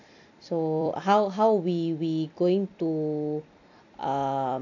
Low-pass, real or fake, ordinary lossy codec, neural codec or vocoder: 7.2 kHz; fake; none; vocoder, 44.1 kHz, 128 mel bands every 256 samples, BigVGAN v2